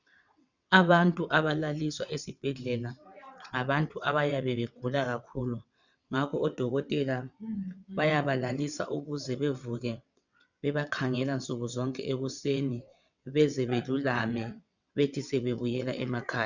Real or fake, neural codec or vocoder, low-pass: fake; vocoder, 22.05 kHz, 80 mel bands, WaveNeXt; 7.2 kHz